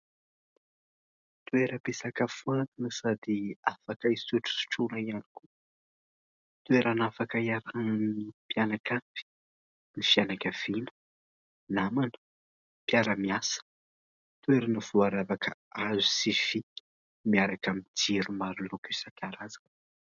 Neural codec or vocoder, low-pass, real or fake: none; 7.2 kHz; real